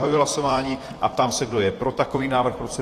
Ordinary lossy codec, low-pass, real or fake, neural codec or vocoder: AAC, 48 kbps; 14.4 kHz; fake; vocoder, 44.1 kHz, 128 mel bands, Pupu-Vocoder